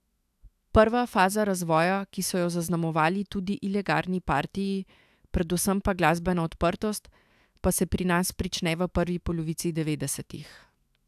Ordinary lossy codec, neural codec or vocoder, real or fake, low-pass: AAC, 96 kbps; autoencoder, 48 kHz, 128 numbers a frame, DAC-VAE, trained on Japanese speech; fake; 14.4 kHz